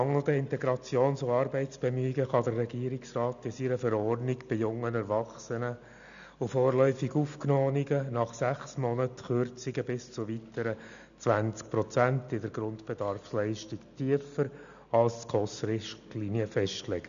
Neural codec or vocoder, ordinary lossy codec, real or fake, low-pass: none; none; real; 7.2 kHz